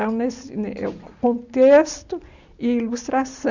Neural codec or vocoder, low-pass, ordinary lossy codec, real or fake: none; 7.2 kHz; none; real